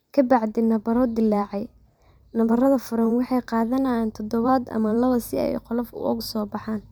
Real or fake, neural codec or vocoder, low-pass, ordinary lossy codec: fake; vocoder, 44.1 kHz, 128 mel bands every 512 samples, BigVGAN v2; none; none